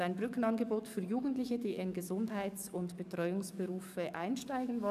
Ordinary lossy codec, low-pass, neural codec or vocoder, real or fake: none; 14.4 kHz; codec, 44.1 kHz, 7.8 kbps, DAC; fake